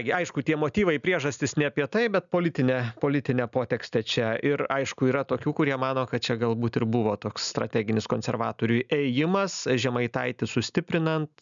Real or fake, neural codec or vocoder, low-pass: real; none; 7.2 kHz